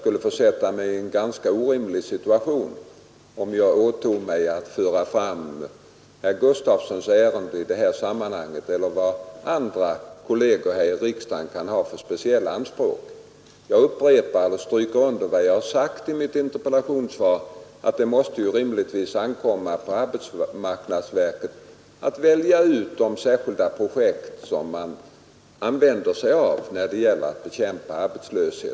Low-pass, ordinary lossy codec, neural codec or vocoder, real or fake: none; none; none; real